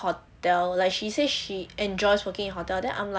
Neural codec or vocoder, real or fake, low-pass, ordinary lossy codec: none; real; none; none